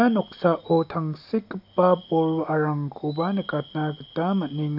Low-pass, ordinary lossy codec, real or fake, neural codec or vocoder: 5.4 kHz; MP3, 48 kbps; real; none